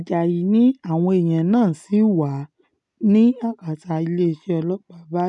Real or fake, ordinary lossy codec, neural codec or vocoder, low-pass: real; none; none; 10.8 kHz